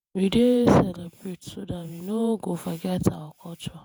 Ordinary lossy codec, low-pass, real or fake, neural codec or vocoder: none; none; fake; vocoder, 48 kHz, 128 mel bands, Vocos